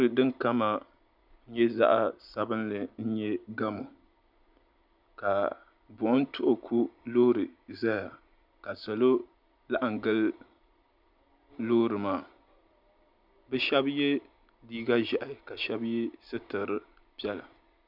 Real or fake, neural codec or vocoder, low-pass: real; none; 5.4 kHz